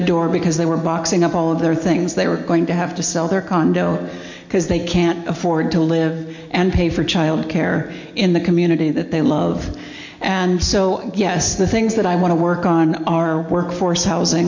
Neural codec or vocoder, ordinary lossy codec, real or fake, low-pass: none; MP3, 48 kbps; real; 7.2 kHz